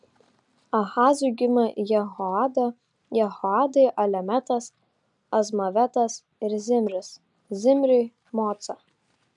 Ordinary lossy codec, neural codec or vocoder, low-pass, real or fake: MP3, 96 kbps; none; 10.8 kHz; real